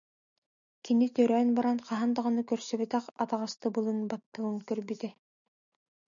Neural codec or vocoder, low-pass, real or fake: none; 7.2 kHz; real